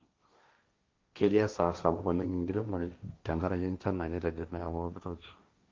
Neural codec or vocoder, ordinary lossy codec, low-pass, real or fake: codec, 16 kHz, 1.1 kbps, Voila-Tokenizer; Opus, 24 kbps; 7.2 kHz; fake